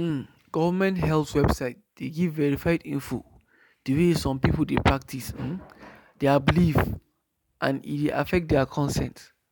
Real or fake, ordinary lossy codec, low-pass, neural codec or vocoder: real; none; none; none